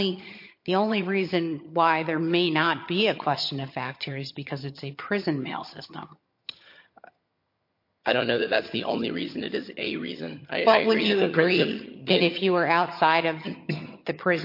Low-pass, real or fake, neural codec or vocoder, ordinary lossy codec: 5.4 kHz; fake; vocoder, 22.05 kHz, 80 mel bands, HiFi-GAN; MP3, 32 kbps